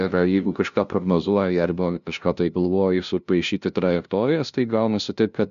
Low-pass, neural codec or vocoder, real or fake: 7.2 kHz; codec, 16 kHz, 0.5 kbps, FunCodec, trained on LibriTTS, 25 frames a second; fake